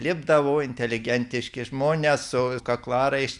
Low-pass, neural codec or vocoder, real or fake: 10.8 kHz; none; real